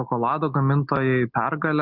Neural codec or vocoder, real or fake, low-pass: none; real; 5.4 kHz